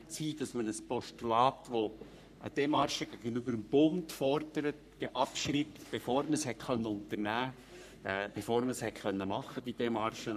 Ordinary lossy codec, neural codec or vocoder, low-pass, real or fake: none; codec, 44.1 kHz, 3.4 kbps, Pupu-Codec; 14.4 kHz; fake